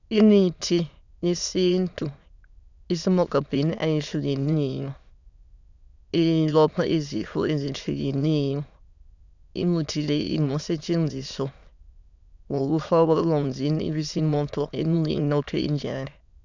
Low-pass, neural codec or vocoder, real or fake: 7.2 kHz; autoencoder, 22.05 kHz, a latent of 192 numbers a frame, VITS, trained on many speakers; fake